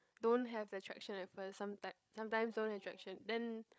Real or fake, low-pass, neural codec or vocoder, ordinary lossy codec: fake; none; codec, 16 kHz, 8 kbps, FreqCodec, larger model; none